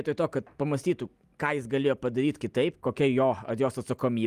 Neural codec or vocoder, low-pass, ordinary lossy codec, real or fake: none; 14.4 kHz; Opus, 32 kbps; real